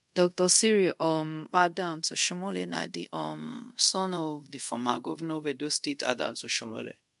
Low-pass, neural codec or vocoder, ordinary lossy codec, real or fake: 10.8 kHz; codec, 24 kHz, 0.5 kbps, DualCodec; MP3, 64 kbps; fake